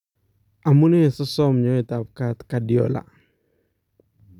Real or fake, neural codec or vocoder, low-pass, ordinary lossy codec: real; none; 19.8 kHz; none